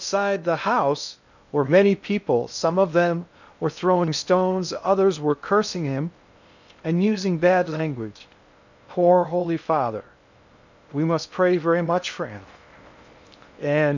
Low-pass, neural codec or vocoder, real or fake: 7.2 kHz; codec, 16 kHz in and 24 kHz out, 0.6 kbps, FocalCodec, streaming, 2048 codes; fake